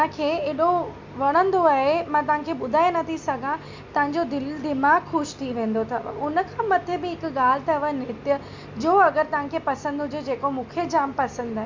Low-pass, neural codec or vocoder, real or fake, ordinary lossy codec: 7.2 kHz; none; real; MP3, 64 kbps